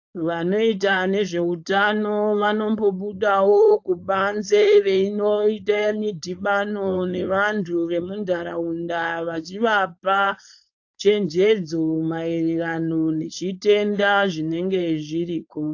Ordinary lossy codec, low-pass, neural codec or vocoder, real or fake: AAC, 48 kbps; 7.2 kHz; codec, 16 kHz, 4.8 kbps, FACodec; fake